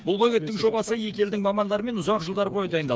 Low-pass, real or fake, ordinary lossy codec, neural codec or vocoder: none; fake; none; codec, 16 kHz, 4 kbps, FreqCodec, smaller model